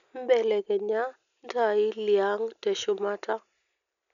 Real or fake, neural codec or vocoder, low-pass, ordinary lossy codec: real; none; 7.2 kHz; none